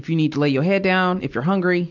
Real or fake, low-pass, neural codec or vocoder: real; 7.2 kHz; none